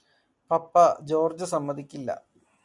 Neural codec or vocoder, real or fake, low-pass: none; real; 10.8 kHz